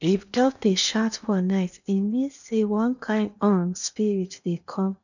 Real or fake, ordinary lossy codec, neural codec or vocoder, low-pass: fake; none; codec, 16 kHz in and 24 kHz out, 0.8 kbps, FocalCodec, streaming, 65536 codes; 7.2 kHz